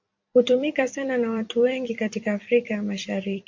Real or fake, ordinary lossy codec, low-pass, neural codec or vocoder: fake; MP3, 48 kbps; 7.2 kHz; vocoder, 44.1 kHz, 128 mel bands every 256 samples, BigVGAN v2